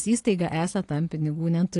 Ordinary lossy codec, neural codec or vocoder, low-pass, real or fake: AAC, 48 kbps; none; 10.8 kHz; real